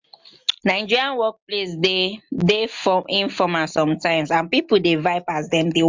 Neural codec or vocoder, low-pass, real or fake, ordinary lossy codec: none; 7.2 kHz; real; MP3, 64 kbps